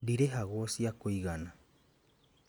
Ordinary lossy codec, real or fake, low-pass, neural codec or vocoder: none; real; none; none